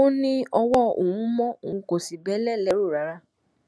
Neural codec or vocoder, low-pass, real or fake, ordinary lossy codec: none; none; real; none